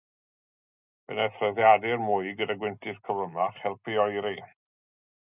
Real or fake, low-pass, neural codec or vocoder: real; 3.6 kHz; none